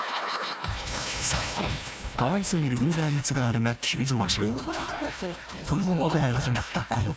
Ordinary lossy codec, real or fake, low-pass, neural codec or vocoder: none; fake; none; codec, 16 kHz, 1 kbps, FunCodec, trained on Chinese and English, 50 frames a second